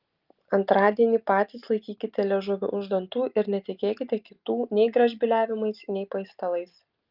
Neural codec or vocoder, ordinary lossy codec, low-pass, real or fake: none; Opus, 24 kbps; 5.4 kHz; real